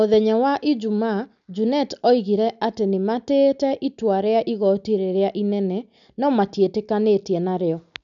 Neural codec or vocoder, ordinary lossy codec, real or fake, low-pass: none; none; real; 7.2 kHz